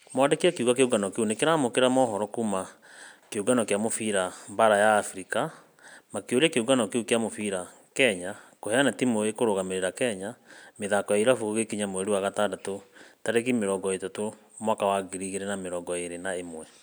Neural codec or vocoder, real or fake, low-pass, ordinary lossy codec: none; real; none; none